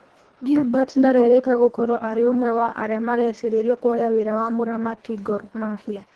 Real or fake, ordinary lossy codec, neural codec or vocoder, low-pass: fake; Opus, 16 kbps; codec, 24 kHz, 1.5 kbps, HILCodec; 10.8 kHz